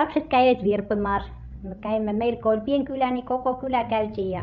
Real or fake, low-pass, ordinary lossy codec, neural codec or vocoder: fake; 7.2 kHz; none; codec, 16 kHz, 16 kbps, FreqCodec, larger model